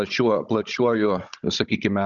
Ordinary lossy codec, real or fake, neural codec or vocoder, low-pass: Opus, 64 kbps; fake; codec, 16 kHz, 16 kbps, FunCodec, trained on Chinese and English, 50 frames a second; 7.2 kHz